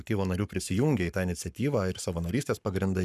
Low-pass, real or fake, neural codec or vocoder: 14.4 kHz; fake; codec, 44.1 kHz, 7.8 kbps, Pupu-Codec